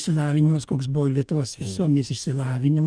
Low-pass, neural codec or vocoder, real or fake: 9.9 kHz; codec, 44.1 kHz, 2.6 kbps, DAC; fake